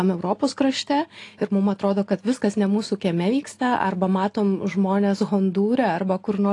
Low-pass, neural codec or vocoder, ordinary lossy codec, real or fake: 10.8 kHz; none; AAC, 48 kbps; real